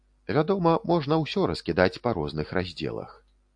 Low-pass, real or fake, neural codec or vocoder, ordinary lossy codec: 9.9 kHz; real; none; AAC, 64 kbps